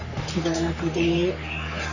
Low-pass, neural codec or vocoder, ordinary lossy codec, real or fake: 7.2 kHz; codec, 44.1 kHz, 3.4 kbps, Pupu-Codec; none; fake